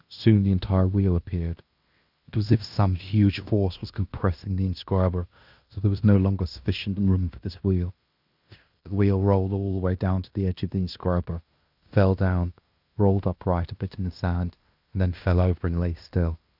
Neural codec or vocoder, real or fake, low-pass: codec, 16 kHz in and 24 kHz out, 0.9 kbps, LongCat-Audio-Codec, fine tuned four codebook decoder; fake; 5.4 kHz